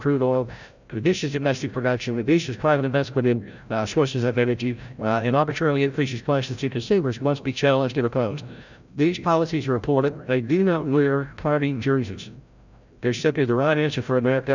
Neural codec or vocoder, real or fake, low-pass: codec, 16 kHz, 0.5 kbps, FreqCodec, larger model; fake; 7.2 kHz